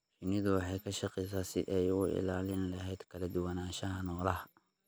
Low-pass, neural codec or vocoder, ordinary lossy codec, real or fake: none; none; none; real